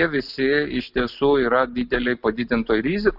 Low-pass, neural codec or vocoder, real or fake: 5.4 kHz; none; real